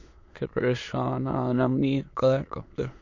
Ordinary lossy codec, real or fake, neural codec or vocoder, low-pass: MP3, 48 kbps; fake; autoencoder, 22.05 kHz, a latent of 192 numbers a frame, VITS, trained on many speakers; 7.2 kHz